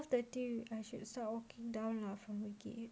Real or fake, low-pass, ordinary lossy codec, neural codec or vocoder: real; none; none; none